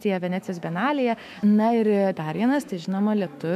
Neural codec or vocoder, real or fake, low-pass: autoencoder, 48 kHz, 128 numbers a frame, DAC-VAE, trained on Japanese speech; fake; 14.4 kHz